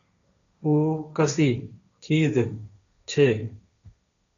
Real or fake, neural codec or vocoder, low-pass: fake; codec, 16 kHz, 1.1 kbps, Voila-Tokenizer; 7.2 kHz